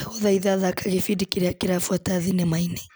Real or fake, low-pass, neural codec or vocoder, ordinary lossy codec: real; none; none; none